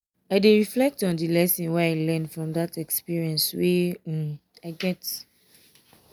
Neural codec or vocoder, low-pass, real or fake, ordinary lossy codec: none; none; real; none